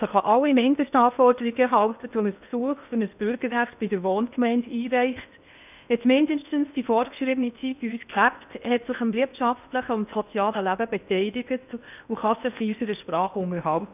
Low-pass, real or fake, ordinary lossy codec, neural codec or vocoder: 3.6 kHz; fake; none; codec, 16 kHz in and 24 kHz out, 0.8 kbps, FocalCodec, streaming, 65536 codes